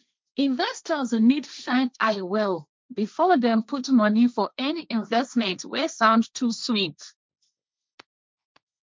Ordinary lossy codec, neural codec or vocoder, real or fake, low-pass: none; codec, 16 kHz, 1.1 kbps, Voila-Tokenizer; fake; none